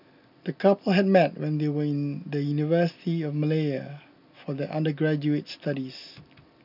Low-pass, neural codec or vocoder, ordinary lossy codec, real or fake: 5.4 kHz; none; none; real